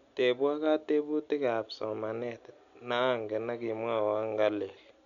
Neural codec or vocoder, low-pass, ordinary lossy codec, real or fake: none; 7.2 kHz; none; real